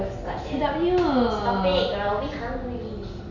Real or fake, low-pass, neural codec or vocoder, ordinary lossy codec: real; 7.2 kHz; none; none